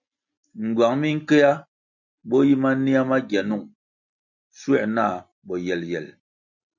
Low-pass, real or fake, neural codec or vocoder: 7.2 kHz; real; none